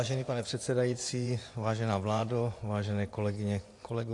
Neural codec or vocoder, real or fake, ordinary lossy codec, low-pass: vocoder, 24 kHz, 100 mel bands, Vocos; fake; AAC, 48 kbps; 10.8 kHz